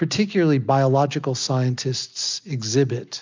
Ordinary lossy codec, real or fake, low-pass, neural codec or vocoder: MP3, 64 kbps; real; 7.2 kHz; none